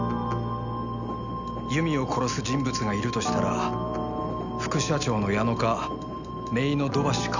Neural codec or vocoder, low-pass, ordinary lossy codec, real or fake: none; 7.2 kHz; none; real